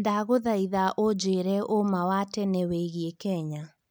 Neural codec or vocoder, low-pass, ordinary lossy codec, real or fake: none; none; none; real